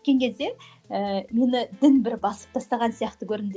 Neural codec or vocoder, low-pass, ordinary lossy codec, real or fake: none; none; none; real